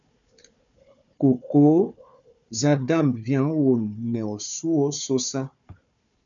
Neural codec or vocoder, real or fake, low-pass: codec, 16 kHz, 4 kbps, FunCodec, trained on Chinese and English, 50 frames a second; fake; 7.2 kHz